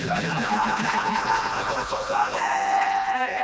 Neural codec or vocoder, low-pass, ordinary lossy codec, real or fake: codec, 16 kHz, 1 kbps, FreqCodec, smaller model; none; none; fake